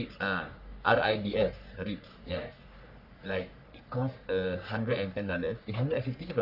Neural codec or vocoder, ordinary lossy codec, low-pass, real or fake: codec, 44.1 kHz, 3.4 kbps, Pupu-Codec; none; 5.4 kHz; fake